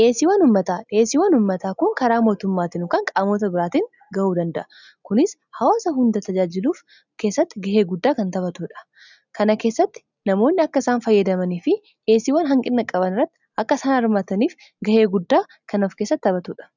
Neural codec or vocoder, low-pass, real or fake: none; 7.2 kHz; real